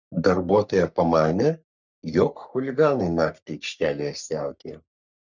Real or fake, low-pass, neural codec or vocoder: fake; 7.2 kHz; codec, 44.1 kHz, 3.4 kbps, Pupu-Codec